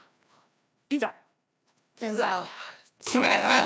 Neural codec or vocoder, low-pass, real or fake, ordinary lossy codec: codec, 16 kHz, 1 kbps, FreqCodec, larger model; none; fake; none